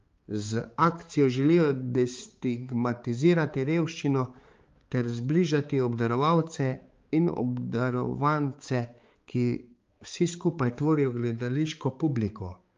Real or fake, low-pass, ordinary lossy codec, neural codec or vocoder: fake; 7.2 kHz; Opus, 32 kbps; codec, 16 kHz, 4 kbps, X-Codec, HuBERT features, trained on balanced general audio